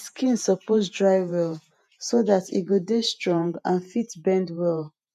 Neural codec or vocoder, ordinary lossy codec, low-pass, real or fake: vocoder, 48 kHz, 128 mel bands, Vocos; MP3, 96 kbps; 14.4 kHz; fake